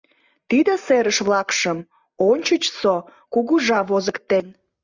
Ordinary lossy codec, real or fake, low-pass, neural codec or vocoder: Opus, 64 kbps; real; 7.2 kHz; none